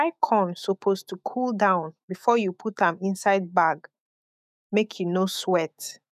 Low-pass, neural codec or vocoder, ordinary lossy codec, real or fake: 14.4 kHz; autoencoder, 48 kHz, 128 numbers a frame, DAC-VAE, trained on Japanese speech; none; fake